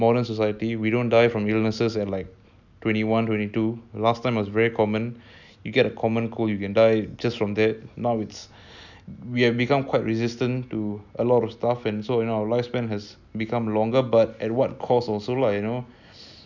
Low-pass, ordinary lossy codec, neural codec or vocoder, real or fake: 7.2 kHz; none; none; real